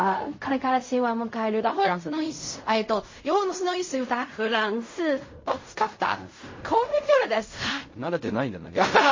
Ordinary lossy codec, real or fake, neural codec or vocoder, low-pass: MP3, 32 kbps; fake; codec, 16 kHz in and 24 kHz out, 0.4 kbps, LongCat-Audio-Codec, fine tuned four codebook decoder; 7.2 kHz